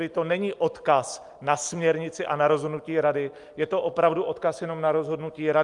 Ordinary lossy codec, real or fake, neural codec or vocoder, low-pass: Opus, 32 kbps; real; none; 10.8 kHz